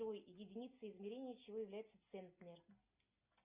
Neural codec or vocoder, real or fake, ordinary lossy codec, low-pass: none; real; Opus, 64 kbps; 3.6 kHz